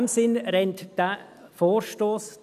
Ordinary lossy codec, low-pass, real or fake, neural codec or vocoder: none; 14.4 kHz; real; none